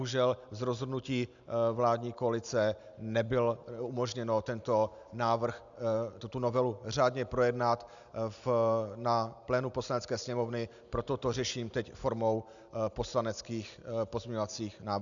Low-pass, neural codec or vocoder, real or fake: 7.2 kHz; none; real